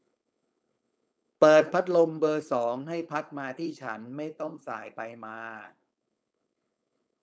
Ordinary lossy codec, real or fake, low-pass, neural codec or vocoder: none; fake; none; codec, 16 kHz, 4.8 kbps, FACodec